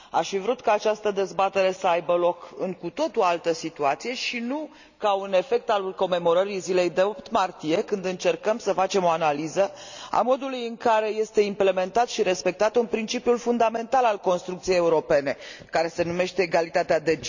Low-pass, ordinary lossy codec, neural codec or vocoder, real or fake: 7.2 kHz; none; none; real